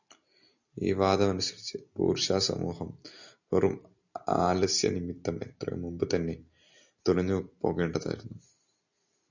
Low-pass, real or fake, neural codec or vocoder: 7.2 kHz; real; none